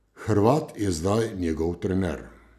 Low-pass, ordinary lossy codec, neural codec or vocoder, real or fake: 14.4 kHz; none; none; real